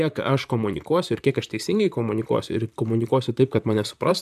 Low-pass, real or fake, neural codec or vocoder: 14.4 kHz; fake; vocoder, 44.1 kHz, 128 mel bands, Pupu-Vocoder